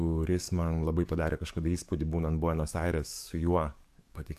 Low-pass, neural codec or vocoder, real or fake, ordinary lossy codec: 14.4 kHz; codec, 44.1 kHz, 7.8 kbps, DAC; fake; AAC, 96 kbps